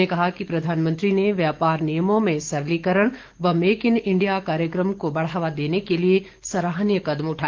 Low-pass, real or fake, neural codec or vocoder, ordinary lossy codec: 7.2 kHz; fake; autoencoder, 48 kHz, 128 numbers a frame, DAC-VAE, trained on Japanese speech; Opus, 16 kbps